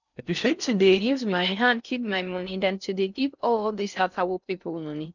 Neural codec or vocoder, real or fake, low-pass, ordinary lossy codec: codec, 16 kHz in and 24 kHz out, 0.6 kbps, FocalCodec, streaming, 4096 codes; fake; 7.2 kHz; none